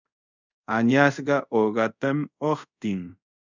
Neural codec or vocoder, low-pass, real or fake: codec, 24 kHz, 0.5 kbps, DualCodec; 7.2 kHz; fake